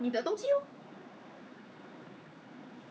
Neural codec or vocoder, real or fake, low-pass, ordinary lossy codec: codec, 16 kHz, 4 kbps, X-Codec, HuBERT features, trained on general audio; fake; none; none